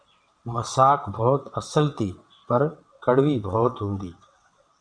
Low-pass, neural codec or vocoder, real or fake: 9.9 kHz; vocoder, 22.05 kHz, 80 mel bands, WaveNeXt; fake